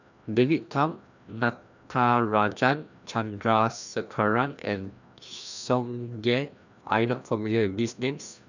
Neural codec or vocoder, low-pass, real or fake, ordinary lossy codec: codec, 16 kHz, 1 kbps, FreqCodec, larger model; 7.2 kHz; fake; none